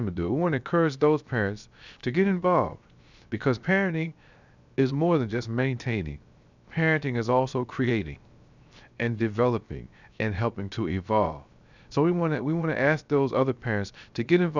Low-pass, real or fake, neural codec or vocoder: 7.2 kHz; fake; codec, 16 kHz, 0.7 kbps, FocalCodec